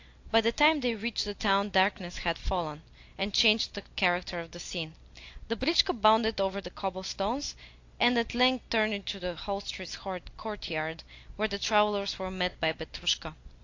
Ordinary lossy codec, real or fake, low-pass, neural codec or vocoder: AAC, 48 kbps; real; 7.2 kHz; none